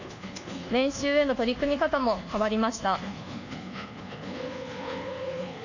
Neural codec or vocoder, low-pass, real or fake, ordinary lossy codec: codec, 24 kHz, 1.2 kbps, DualCodec; 7.2 kHz; fake; none